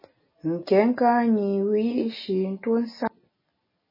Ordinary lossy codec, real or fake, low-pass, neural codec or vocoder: MP3, 24 kbps; real; 5.4 kHz; none